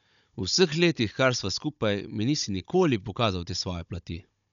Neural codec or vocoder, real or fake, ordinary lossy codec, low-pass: codec, 16 kHz, 16 kbps, FunCodec, trained on Chinese and English, 50 frames a second; fake; none; 7.2 kHz